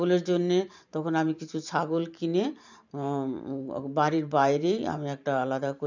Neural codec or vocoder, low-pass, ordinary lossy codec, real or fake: vocoder, 44.1 kHz, 128 mel bands every 512 samples, BigVGAN v2; 7.2 kHz; none; fake